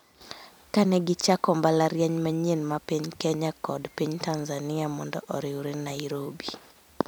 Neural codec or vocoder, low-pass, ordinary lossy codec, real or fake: vocoder, 44.1 kHz, 128 mel bands every 256 samples, BigVGAN v2; none; none; fake